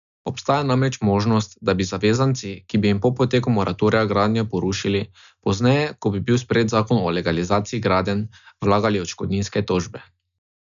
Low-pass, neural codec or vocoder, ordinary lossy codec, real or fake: 7.2 kHz; none; none; real